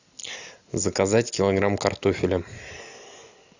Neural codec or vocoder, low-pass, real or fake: none; 7.2 kHz; real